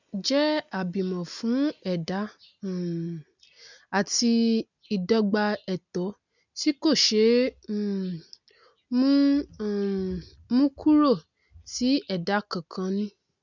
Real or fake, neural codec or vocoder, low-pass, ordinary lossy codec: real; none; 7.2 kHz; none